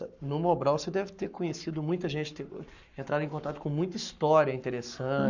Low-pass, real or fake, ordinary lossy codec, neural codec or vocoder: 7.2 kHz; fake; none; codec, 44.1 kHz, 7.8 kbps, Pupu-Codec